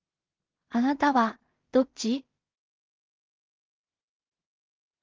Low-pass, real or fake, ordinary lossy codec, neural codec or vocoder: 7.2 kHz; fake; Opus, 32 kbps; codec, 16 kHz in and 24 kHz out, 0.4 kbps, LongCat-Audio-Codec, two codebook decoder